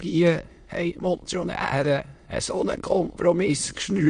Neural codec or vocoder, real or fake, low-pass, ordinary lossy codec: autoencoder, 22.05 kHz, a latent of 192 numbers a frame, VITS, trained on many speakers; fake; 9.9 kHz; MP3, 48 kbps